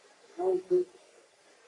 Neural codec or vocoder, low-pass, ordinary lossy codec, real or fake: codec, 44.1 kHz, 3.4 kbps, Pupu-Codec; 10.8 kHz; AAC, 48 kbps; fake